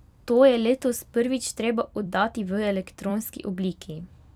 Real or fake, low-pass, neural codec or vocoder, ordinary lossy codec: fake; 19.8 kHz; vocoder, 44.1 kHz, 128 mel bands every 256 samples, BigVGAN v2; none